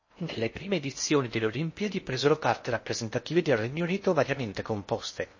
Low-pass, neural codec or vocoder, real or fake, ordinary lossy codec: 7.2 kHz; codec, 16 kHz in and 24 kHz out, 0.6 kbps, FocalCodec, streaming, 4096 codes; fake; MP3, 32 kbps